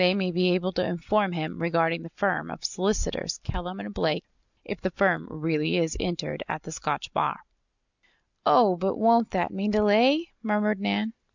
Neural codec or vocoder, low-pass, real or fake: none; 7.2 kHz; real